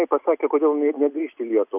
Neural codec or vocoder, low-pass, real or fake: none; 3.6 kHz; real